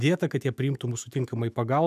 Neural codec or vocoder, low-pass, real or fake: autoencoder, 48 kHz, 128 numbers a frame, DAC-VAE, trained on Japanese speech; 14.4 kHz; fake